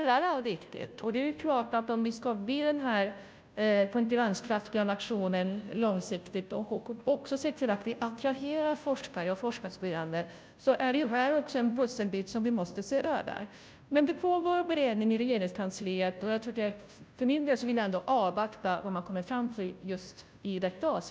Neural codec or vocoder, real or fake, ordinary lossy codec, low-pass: codec, 16 kHz, 0.5 kbps, FunCodec, trained on Chinese and English, 25 frames a second; fake; none; none